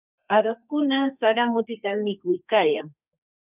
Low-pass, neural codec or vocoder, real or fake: 3.6 kHz; codec, 32 kHz, 1.9 kbps, SNAC; fake